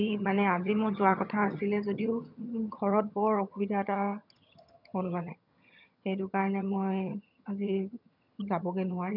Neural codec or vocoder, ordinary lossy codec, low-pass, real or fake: vocoder, 22.05 kHz, 80 mel bands, HiFi-GAN; none; 5.4 kHz; fake